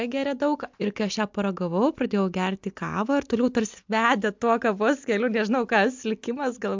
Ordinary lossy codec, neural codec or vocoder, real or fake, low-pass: MP3, 64 kbps; none; real; 7.2 kHz